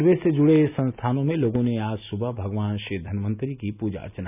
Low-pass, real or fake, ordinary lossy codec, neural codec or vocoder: 3.6 kHz; real; none; none